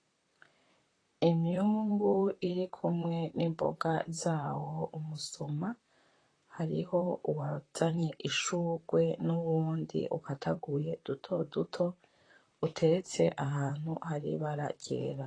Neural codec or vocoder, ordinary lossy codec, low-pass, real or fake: vocoder, 44.1 kHz, 128 mel bands, Pupu-Vocoder; AAC, 32 kbps; 9.9 kHz; fake